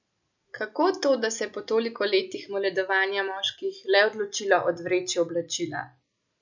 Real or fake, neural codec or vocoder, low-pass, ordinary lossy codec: real; none; 7.2 kHz; none